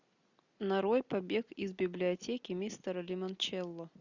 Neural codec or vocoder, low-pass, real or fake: none; 7.2 kHz; real